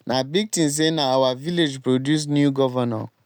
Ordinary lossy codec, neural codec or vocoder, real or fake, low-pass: none; none; real; none